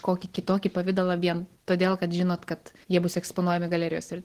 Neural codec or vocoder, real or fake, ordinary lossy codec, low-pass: none; real; Opus, 16 kbps; 14.4 kHz